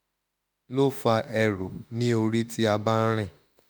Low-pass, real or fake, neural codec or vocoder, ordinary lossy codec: none; fake; autoencoder, 48 kHz, 32 numbers a frame, DAC-VAE, trained on Japanese speech; none